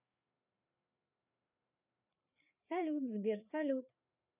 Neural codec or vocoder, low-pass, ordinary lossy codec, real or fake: codec, 16 kHz, 4 kbps, FreqCodec, larger model; 3.6 kHz; none; fake